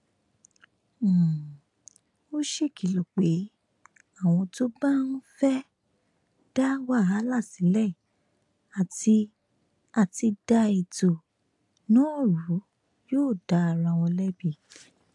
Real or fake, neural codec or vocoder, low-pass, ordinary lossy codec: real; none; 10.8 kHz; AAC, 64 kbps